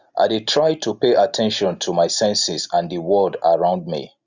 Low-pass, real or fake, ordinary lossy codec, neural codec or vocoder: 7.2 kHz; real; Opus, 64 kbps; none